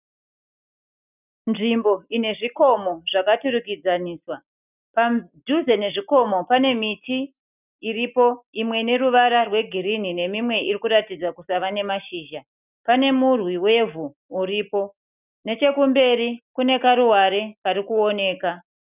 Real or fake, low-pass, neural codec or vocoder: real; 3.6 kHz; none